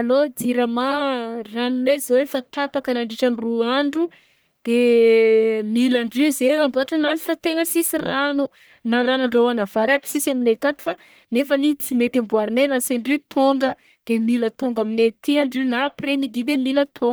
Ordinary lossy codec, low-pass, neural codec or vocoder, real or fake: none; none; codec, 44.1 kHz, 1.7 kbps, Pupu-Codec; fake